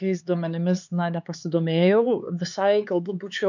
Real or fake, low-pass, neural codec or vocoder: fake; 7.2 kHz; codec, 16 kHz, 4 kbps, X-Codec, HuBERT features, trained on LibriSpeech